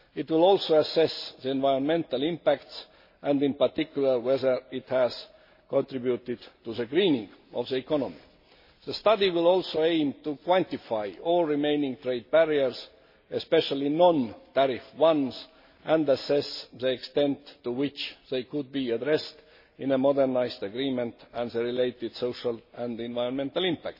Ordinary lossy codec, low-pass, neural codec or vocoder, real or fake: MP3, 24 kbps; 5.4 kHz; none; real